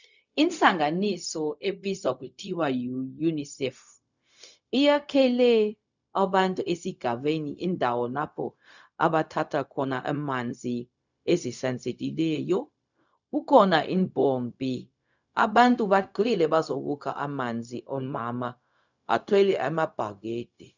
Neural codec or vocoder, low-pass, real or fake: codec, 16 kHz, 0.4 kbps, LongCat-Audio-Codec; 7.2 kHz; fake